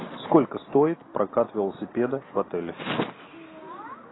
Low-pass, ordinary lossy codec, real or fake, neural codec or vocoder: 7.2 kHz; AAC, 16 kbps; real; none